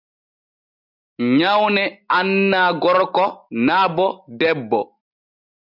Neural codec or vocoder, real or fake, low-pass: none; real; 5.4 kHz